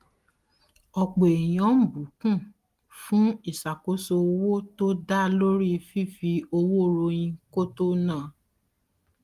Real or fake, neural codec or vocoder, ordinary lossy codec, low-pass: real; none; Opus, 24 kbps; 14.4 kHz